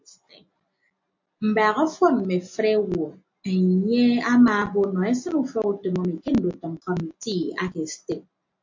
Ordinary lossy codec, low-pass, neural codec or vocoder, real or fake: MP3, 48 kbps; 7.2 kHz; none; real